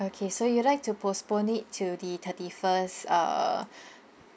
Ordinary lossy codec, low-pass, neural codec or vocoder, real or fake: none; none; none; real